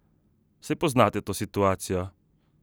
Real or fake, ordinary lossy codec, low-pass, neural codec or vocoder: real; none; none; none